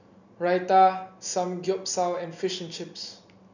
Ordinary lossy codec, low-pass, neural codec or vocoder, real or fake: none; 7.2 kHz; none; real